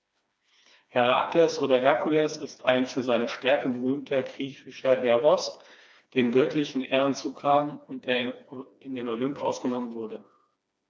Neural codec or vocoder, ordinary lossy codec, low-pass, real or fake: codec, 16 kHz, 2 kbps, FreqCodec, smaller model; none; none; fake